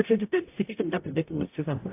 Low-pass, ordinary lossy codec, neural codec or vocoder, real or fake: 3.6 kHz; AAC, 24 kbps; codec, 44.1 kHz, 0.9 kbps, DAC; fake